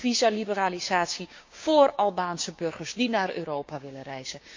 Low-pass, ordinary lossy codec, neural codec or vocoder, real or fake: 7.2 kHz; MP3, 48 kbps; codec, 16 kHz, 6 kbps, DAC; fake